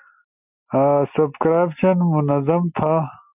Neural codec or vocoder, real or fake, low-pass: none; real; 3.6 kHz